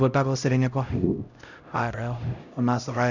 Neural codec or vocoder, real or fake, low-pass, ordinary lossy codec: codec, 16 kHz, 0.5 kbps, X-Codec, HuBERT features, trained on LibriSpeech; fake; 7.2 kHz; none